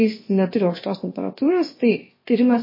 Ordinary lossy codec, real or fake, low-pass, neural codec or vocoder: MP3, 24 kbps; fake; 5.4 kHz; codec, 16 kHz, about 1 kbps, DyCAST, with the encoder's durations